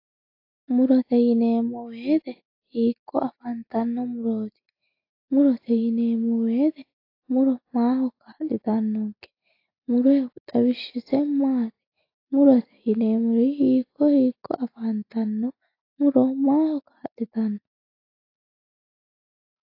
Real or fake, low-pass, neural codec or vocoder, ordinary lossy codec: real; 5.4 kHz; none; AAC, 32 kbps